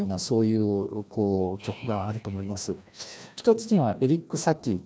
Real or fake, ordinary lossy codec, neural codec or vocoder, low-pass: fake; none; codec, 16 kHz, 1 kbps, FreqCodec, larger model; none